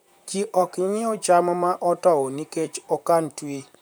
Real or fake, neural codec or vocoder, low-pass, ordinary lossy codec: fake; vocoder, 44.1 kHz, 128 mel bands, Pupu-Vocoder; none; none